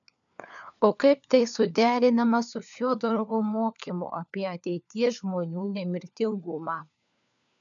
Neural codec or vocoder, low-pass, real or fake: codec, 16 kHz, 4 kbps, FunCodec, trained on LibriTTS, 50 frames a second; 7.2 kHz; fake